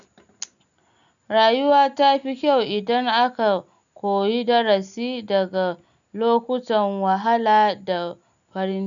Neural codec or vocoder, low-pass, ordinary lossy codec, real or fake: none; 7.2 kHz; none; real